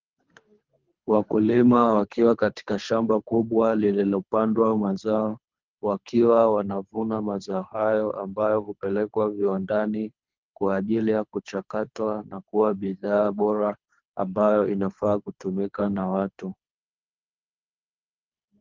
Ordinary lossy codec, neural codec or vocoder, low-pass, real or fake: Opus, 32 kbps; codec, 24 kHz, 3 kbps, HILCodec; 7.2 kHz; fake